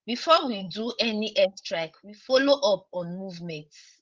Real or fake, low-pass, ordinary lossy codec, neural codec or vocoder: fake; 7.2 kHz; Opus, 16 kbps; codec, 16 kHz, 16 kbps, FreqCodec, larger model